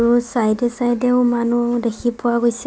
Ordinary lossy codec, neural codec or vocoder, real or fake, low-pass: none; none; real; none